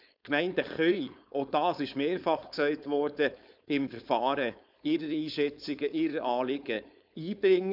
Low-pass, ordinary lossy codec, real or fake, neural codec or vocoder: 5.4 kHz; none; fake; codec, 16 kHz, 4.8 kbps, FACodec